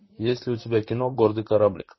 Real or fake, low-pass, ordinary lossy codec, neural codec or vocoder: fake; 7.2 kHz; MP3, 24 kbps; vocoder, 44.1 kHz, 128 mel bands, Pupu-Vocoder